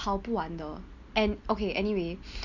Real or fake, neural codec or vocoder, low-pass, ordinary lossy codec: real; none; 7.2 kHz; none